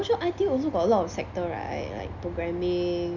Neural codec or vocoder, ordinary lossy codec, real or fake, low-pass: none; none; real; 7.2 kHz